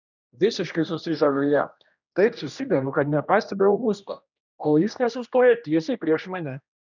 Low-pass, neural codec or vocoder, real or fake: 7.2 kHz; codec, 16 kHz, 1 kbps, X-Codec, HuBERT features, trained on general audio; fake